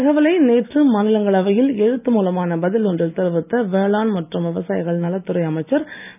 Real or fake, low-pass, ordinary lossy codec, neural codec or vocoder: real; 3.6 kHz; none; none